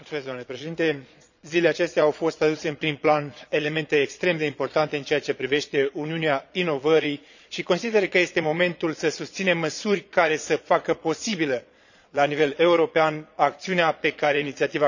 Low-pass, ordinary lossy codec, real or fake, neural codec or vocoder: 7.2 kHz; none; fake; vocoder, 22.05 kHz, 80 mel bands, Vocos